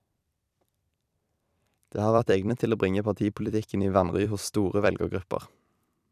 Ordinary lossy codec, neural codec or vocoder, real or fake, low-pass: none; vocoder, 44.1 kHz, 128 mel bands every 256 samples, BigVGAN v2; fake; 14.4 kHz